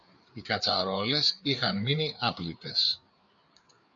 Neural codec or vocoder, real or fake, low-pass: codec, 16 kHz, 4 kbps, FreqCodec, larger model; fake; 7.2 kHz